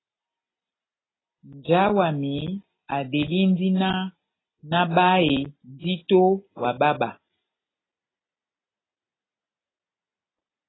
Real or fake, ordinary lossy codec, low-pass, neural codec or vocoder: real; AAC, 16 kbps; 7.2 kHz; none